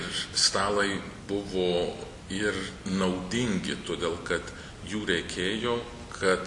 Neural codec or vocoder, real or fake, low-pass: none; real; 10.8 kHz